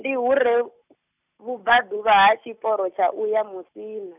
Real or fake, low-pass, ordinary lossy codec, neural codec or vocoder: real; 3.6 kHz; none; none